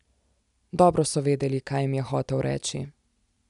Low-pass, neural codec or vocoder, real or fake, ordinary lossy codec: 10.8 kHz; none; real; none